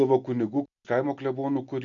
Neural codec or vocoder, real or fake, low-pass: none; real; 7.2 kHz